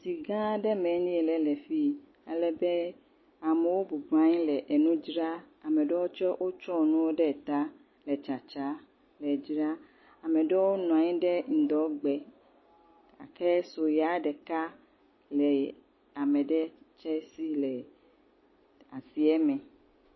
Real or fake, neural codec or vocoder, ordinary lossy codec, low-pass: real; none; MP3, 24 kbps; 7.2 kHz